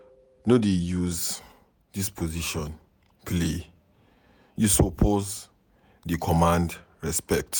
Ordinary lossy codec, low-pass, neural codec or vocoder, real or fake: none; none; vocoder, 48 kHz, 128 mel bands, Vocos; fake